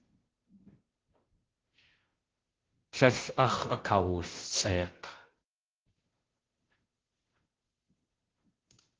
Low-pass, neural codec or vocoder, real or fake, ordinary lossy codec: 7.2 kHz; codec, 16 kHz, 0.5 kbps, FunCodec, trained on Chinese and English, 25 frames a second; fake; Opus, 16 kbps